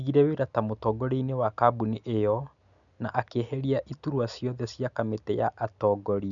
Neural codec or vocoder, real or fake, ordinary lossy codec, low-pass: none; real; none; 7.2 kHz